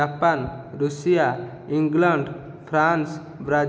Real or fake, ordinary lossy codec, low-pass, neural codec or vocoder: real; none; none; none